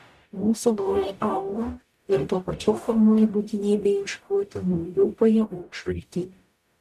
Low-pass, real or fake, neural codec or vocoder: 14.4 kHz; fake; codec, 44.1 kHz, 0.9 kbps, DAC